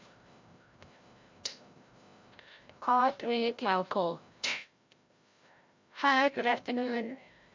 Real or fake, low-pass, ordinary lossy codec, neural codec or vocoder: fake; 7.2 kHz; MP3, 48 kbps; codec, 16 kHz, 0.5 kbps, FreqCodec, larger model